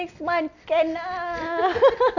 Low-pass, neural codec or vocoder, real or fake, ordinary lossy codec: 7.2 kHz; none; real; none